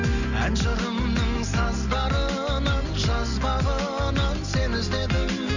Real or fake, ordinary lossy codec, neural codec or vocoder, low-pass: real; none; none; 7.2 kHz